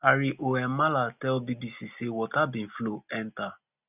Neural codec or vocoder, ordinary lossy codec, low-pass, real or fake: none; none; 3.6 kHz; real